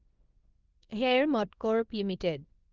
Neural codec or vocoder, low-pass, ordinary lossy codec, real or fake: codec, 24 kHz, 0.9 kbps, WavTokenizer, small release; 7.2 kHz; Opus, 32 kbps; fake